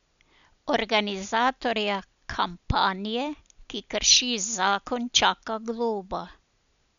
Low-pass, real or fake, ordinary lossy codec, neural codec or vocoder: 7.2 kHz; real; none; none